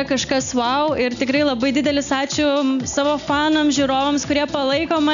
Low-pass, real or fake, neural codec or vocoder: 7.2 kHz; real; none